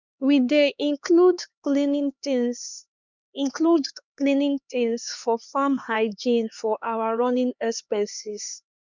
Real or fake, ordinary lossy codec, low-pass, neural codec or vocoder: fake; none; 7.2 kHz; codec, 16 kHz, 2 kbps, X-Codec, HuBERT features, trained on LibriSpeech